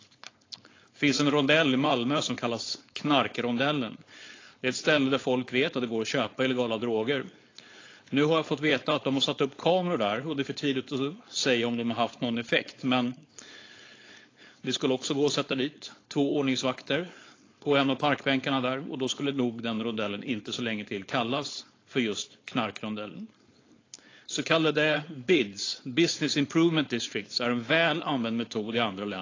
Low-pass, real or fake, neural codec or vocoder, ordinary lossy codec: 7.2 kHz; fake; codec, 16 kHz, 4.8 kbps, FACodec; AAC, 32 kbps